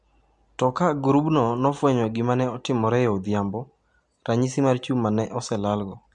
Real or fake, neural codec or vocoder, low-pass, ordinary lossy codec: real; none; 10.8 kHz; MP3, 64 kbps